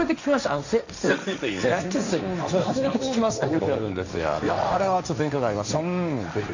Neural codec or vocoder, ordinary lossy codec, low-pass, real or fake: codec, 16 kHz, 1.1 kbps, Voila-Tokenizer; none; 7.2 kHz; fake